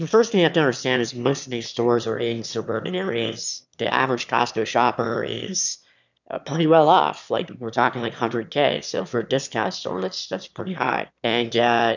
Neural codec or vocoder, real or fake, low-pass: autoencoder, 22.05 kHz, a latent of 192 numbers a frame, VITS, trained on one speaker; fake; 7.2 kHz